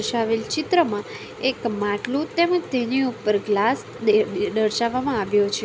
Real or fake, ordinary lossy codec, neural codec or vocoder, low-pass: real; none; none; none